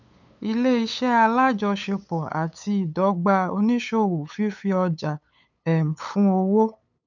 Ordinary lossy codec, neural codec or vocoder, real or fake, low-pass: none; codec, 16 kHz, 8 kbps, FunCodec, trained on LibriTTS, 25 frames a second; fake; 7.2 kHz